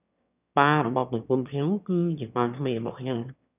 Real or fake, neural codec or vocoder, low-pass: fake; autoencoder, 22.05 kHz, a latent of 192 numbers a frame, VITS, trained on one speaker; 3.6 kHz